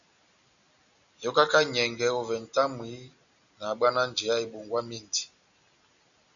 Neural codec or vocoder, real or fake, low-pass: none; real; 7.2 kHz